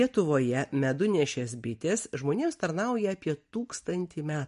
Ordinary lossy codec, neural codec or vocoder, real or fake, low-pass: MP3, 48 kbps; none; real; 10.8 kHz